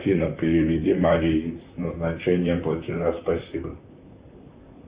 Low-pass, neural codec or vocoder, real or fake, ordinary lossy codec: 3.6 kHz; vocoder, 44.1 kHz, 128 mel bands, Pupu-Vocoder; fake; Opus, 64 kbps